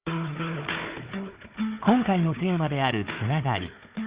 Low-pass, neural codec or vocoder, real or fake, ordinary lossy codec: 3.6 kHz; codec, 16 kHz, 4 kbps, FunCodec, trained on Chinese and English, 50 frames a second; fake; Opus, 64 kbps